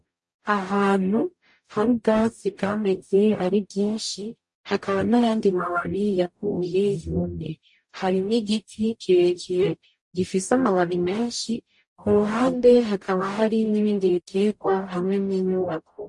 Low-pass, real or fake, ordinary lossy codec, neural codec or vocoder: 10.8 kHz; fake; MP3, 48 kbps; codec, 44.1 kHz, 0.9 kbps, DAC